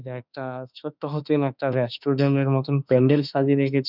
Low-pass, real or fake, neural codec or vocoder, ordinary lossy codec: 5.4 kHz; fake; autoencoder, 48 kHz, 32 numbers a frame, DAC-VAE, trained on Japanese speech; none